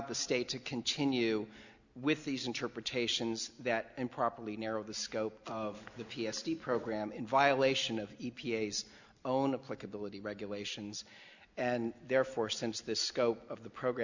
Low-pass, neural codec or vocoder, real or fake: 7.2 kHz; none; real